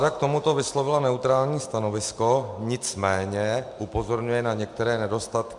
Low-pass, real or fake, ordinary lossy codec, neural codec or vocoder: 10.8 kHz; real; MP3, 64 kbps; none